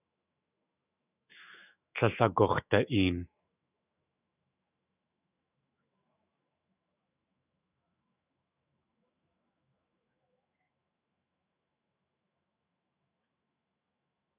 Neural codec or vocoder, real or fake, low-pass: autoencoder, 48 kHz, 128 numbers a frame, DAC-VAE, trained on Japanese speech; fake; 3.6 kHz